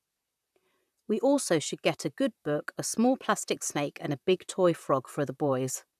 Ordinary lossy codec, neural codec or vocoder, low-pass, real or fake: none; vocoder, 44.1 kHz, 128 mel bands, Pupu-Vocoder; 14.4 kHz; fake